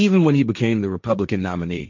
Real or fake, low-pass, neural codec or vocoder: fake; 7.2 kHz; codec, 16 kHz, 1.1 kbps, Voila-Tokenizer